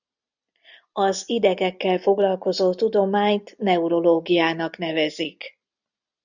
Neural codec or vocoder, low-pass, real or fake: none; 7.2 kHz; real